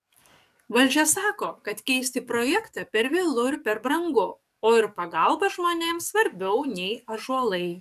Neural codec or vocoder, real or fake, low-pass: codec, 44.1 kHz, 7.8 kbps, DAC; fake; 14.4 kHz